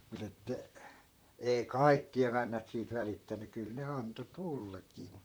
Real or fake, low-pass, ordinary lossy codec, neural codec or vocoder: fake; none; none; vocoder, 44.1 kHz, 128 mel bands, Pupu-Vocoder